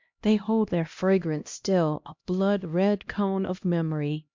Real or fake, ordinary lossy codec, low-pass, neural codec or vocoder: fake; MP3, 64 kbps; 7.2 kHz; codec, 16 kHz, 1 kbps, X-Codec, HuBERT features, trained on LibriSpeech